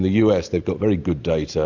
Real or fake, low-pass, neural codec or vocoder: real; 7.2 kHz; none